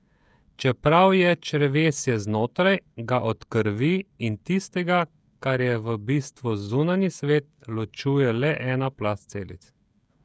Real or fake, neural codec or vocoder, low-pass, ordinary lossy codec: fake; codec, 16 kHz, 16 kbps, FreqCodec, smaller model; none; none